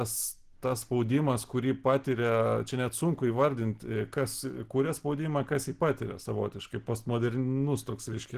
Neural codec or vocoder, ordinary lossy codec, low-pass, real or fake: none; Opus, 16 kbps; 14.4 kHz; real